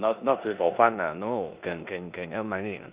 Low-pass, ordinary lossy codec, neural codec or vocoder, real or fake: 3.6 kHz; Opus, 64 kbps; codec, 16 kHz in and 24 kHz out, 0.9 kbps, LongCat-Audio-Codec, four codebook decoder; fake